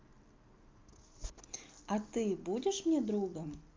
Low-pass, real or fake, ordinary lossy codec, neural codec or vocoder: 7.2 kHz; real; Opus, 24 kbps; none